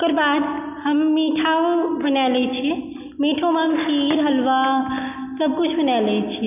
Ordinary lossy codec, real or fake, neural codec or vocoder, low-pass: none; real; none; 3.6 kHz